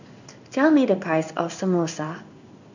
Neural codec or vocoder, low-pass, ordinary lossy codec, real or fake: codec, 16 kHz in and 24 kHz out, 1 kbps, XY-Tokenizer; 7.2 kHz; none; fake